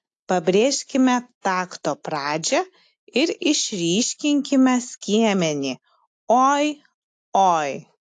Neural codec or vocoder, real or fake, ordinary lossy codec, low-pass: none; real; AAC, 64 kbps; 10.8 kHz